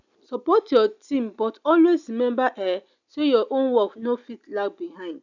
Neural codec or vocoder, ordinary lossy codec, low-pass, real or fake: none; none; 7.2 kHz; real